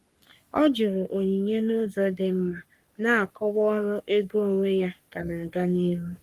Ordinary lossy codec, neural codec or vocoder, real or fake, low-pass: Opus, 32 kbps; codec, 44.1 kHz, 3.4 kbps, Pupu-Codec; fake; 14.4 kHz